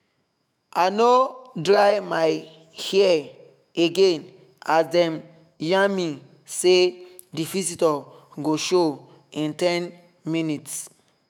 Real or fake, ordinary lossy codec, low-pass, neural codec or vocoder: fake; none; 19.8 kHz; autoencoder, 48 kHz, 128 numbers a frame, DAC-VAE, trained on Japanese speech